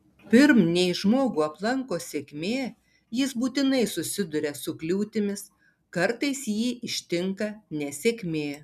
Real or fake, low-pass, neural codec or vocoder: real; 14.4 kHz; none